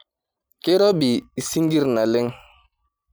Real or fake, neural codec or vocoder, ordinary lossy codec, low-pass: real; none; none; none